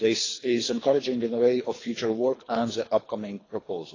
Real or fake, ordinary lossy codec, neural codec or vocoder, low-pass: fake; AAC, 32 kbps; codec, 24 kHz, 3 kbps, HILCodec; 7.2 kHz